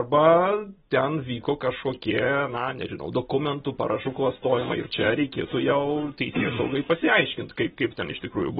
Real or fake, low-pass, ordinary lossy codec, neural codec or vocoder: real; 7.2 kHz; AAC, 16 kbps; none